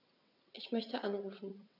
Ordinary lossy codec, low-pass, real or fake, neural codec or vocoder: none; 5.4 kHz; fake; vocoder, 22.05 kHz, 80 mel bands, WaveNeXt